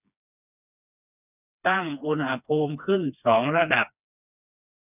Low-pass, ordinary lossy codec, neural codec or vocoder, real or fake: 3.6 kHz; none; codec, 16 kHz, 2 kbps, FreqCodec, smaller model; fake